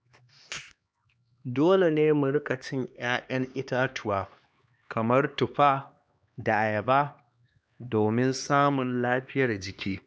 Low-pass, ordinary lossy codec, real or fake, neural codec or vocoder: none; none; fake; codec, 16 kHz, 2 kbps, X-Codec, HuBERT features, trained on LibriSpeech